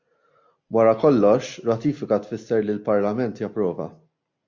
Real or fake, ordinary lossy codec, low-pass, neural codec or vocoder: real; MP3, 48 kbps; 7.2 kHz; none